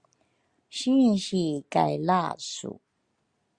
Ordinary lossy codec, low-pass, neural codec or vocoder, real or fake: Opus, 64 kbps; 9.9 kHz; none; real